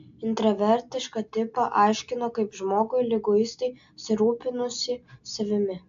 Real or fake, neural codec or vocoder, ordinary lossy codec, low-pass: real; none; AAC, 64 kbps; 7.2 kHz